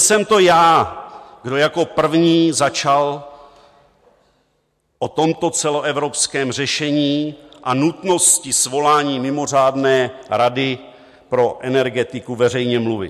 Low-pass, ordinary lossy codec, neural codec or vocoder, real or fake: 14.4 kHz; MP3, 64 kbps; none; real